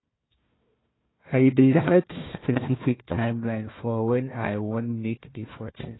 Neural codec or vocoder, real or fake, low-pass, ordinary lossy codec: codec, 16 kHz, 1 kbps, FunCodec, trained on Chinese and English, 50 frames a second; fake; 7.2 kHz; AAC, 16 kbps